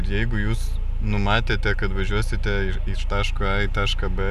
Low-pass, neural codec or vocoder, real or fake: 14.4 kHz; none; real